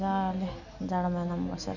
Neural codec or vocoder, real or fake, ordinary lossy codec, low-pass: none; real; none; 7.2 kHz